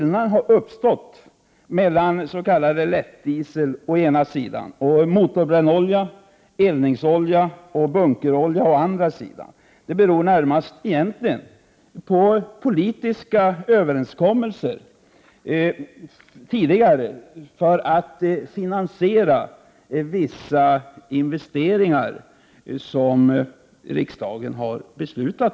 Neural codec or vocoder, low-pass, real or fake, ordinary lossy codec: none; none; real; none